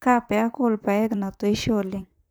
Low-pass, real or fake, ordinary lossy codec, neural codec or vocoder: none; fake; none; vocoder, 44.1 kHz, 128 mel bands, Pupu-Vocoder